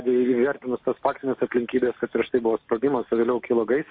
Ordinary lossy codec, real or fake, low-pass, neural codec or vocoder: MP3, 32 kbps; real; 5.4 kHz; none